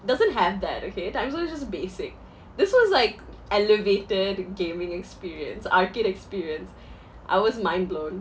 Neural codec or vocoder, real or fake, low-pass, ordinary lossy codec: none; real; none; none